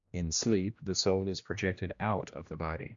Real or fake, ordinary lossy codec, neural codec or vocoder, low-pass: fake; Opus, 64 kbps; codec, 16 kHz, 1 kbps, X-Codec, HuBERT features, trained on general audio; 7.2 kHz